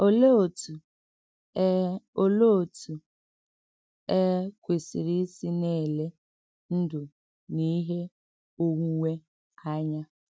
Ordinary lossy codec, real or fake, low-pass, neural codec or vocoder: none; real; none; none